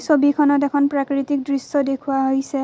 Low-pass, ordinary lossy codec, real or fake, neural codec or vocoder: none; none; real; none